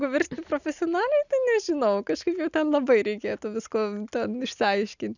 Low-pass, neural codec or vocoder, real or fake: 7.2 kHz; none; real